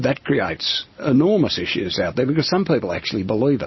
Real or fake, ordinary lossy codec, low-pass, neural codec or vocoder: real; MP3, 24 kbps; 7.2 kHz; none